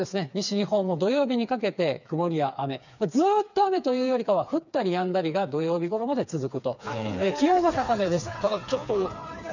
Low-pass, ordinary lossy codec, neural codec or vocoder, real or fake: 7.2 kHz; none; codec, 16 kHz, 4 kbps, FreqCodec, smaller model; fake